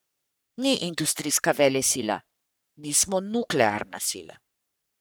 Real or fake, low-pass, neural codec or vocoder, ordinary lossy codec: fake; none; codec, 44.1 kHz, 3.4 kbps, Pupu-Codec; none